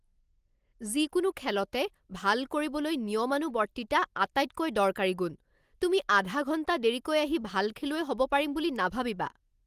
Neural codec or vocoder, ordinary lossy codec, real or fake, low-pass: none; Opus, 24 kbps; real; 14.4 kHz